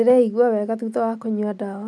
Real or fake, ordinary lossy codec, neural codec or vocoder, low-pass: real; none; none; none